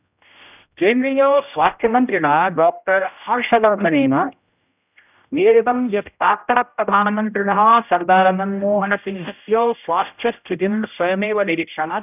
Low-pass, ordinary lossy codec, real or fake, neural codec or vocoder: 3.6 kHz; none; fake; codec, 16 kHz, 0.5 kbps, X-Codec, HuBERT features, trained on general audio